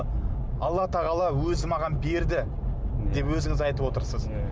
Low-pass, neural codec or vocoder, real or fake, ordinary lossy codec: none; none; real; none